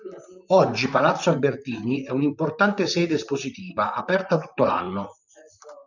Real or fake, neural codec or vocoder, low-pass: fake; vocoder, 44.1 kHz, 128 mel bands, Pupu-Vocoder; 7.2 kHz